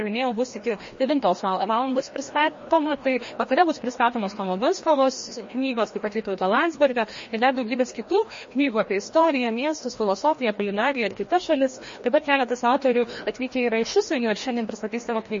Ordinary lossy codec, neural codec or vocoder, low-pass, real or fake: MP3, 32 kbps; codec, 16 kHz, 1 kbps, FreqCodec, larger model; 7.2 kHz; fake